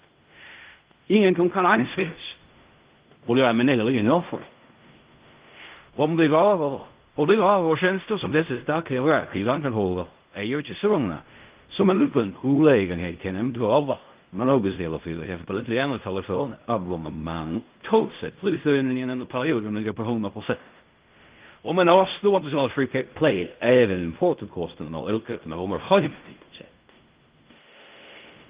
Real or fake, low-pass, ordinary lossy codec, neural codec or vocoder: fake; 3.6 kHz; Opus, 32 kbps; codec, 16 kHz in and 24 kHz out, 0.4 kbps, LongCat-Audio-Codec, fine tuned four codebook decoder